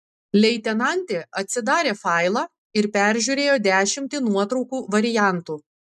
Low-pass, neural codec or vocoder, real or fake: 14.4 kHz; none; real